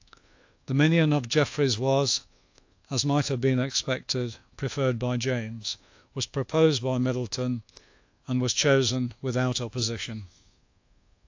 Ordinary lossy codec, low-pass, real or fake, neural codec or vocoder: AAC, 48 kbps; 7.2 kHz; fake; codec, 24 kHz, 1.2 kbps, DualCodec